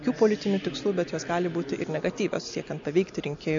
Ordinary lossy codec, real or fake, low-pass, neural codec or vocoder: MP3, 64 kbps; real; 7.2 kHz; none